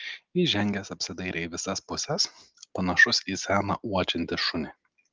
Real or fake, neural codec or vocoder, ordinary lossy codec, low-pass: real; none; Opus, 32 kbps; 7.2 kHz